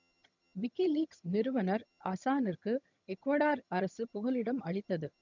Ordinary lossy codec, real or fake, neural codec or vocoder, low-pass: none; fake; vocoder, 22.05 kHz, 80 mel bands, HiFi-GAN; 7.2 kHz